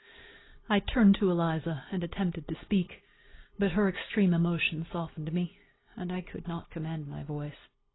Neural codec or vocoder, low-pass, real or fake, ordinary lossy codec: none; 7.2 kHz; real; AAC, 16 kbps